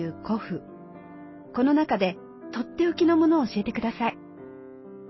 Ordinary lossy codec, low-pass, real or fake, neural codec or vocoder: MP3, 24 kbps; 7.2 kHz; real; none